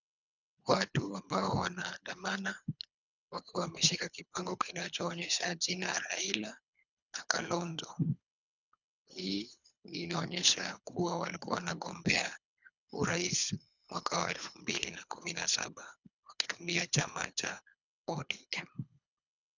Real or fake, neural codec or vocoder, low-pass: fake; codec, 24 kHz, 3 kbps, HILCodec; 7.2 kHz